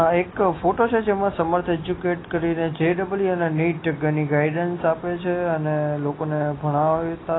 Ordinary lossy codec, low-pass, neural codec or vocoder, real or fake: AAC, 16 kbps; 7.2 kHz; none; real